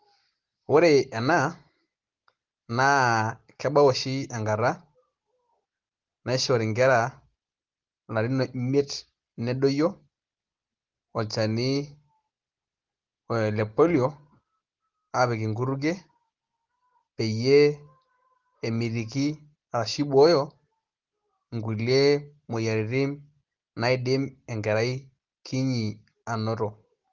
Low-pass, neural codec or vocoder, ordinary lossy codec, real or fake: 7.2 kHz; none; Opus, 16 kbps; real